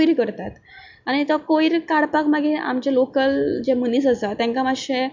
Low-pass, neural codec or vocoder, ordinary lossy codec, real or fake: 7.2 kHz; none; MP3, 64 kbps; real